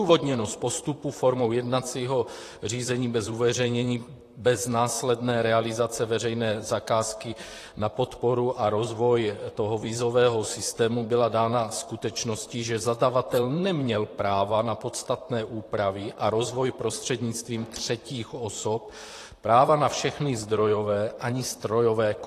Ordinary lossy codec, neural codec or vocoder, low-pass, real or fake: AAC, 48 kbps; vocoder, 44.1 kHz, 128 mel bands, Pupu-Vocoder; 14.4 kHz; fake